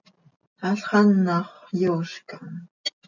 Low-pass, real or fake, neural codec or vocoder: 7.2 kHz; real; none